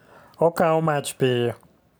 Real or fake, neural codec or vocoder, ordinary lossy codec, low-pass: real; none; none; none